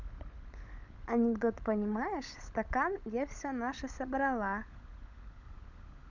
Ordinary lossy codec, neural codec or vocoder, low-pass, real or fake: none; codec, 16 kHz, 16 kbps, FunCodec, trained on LibriTTS, 50 frames a second; 7.2 kHz; fake